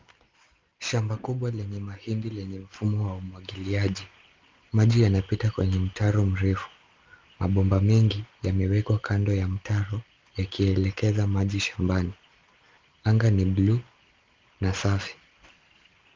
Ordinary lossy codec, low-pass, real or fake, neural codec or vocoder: Opus, 32 kbps; 7.2 kHz; real; none